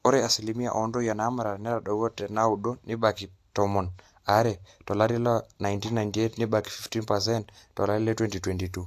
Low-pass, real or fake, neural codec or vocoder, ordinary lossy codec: 14.4 kHz; real; none; AAC, 64 kbps